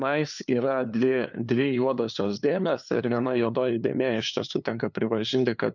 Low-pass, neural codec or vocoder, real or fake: 7.2 kHz; codec, 16 kHz, 2 kbps, FunCodec, trained on LibriTTS, 25 frames a second; fake